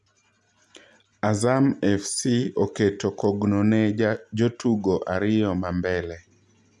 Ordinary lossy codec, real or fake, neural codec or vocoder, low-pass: none; real; none; none